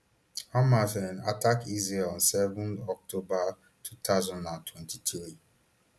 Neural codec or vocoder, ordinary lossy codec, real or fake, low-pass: none; none; real; none